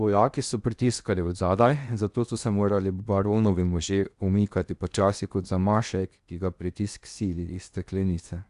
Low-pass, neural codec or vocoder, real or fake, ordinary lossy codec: 10.8 kHz; codec, 16 kHz in and 24 kHz out, 0.8 kbps, FocalCodec, streaming, 65536 codes; fake; Opus, 64 kbps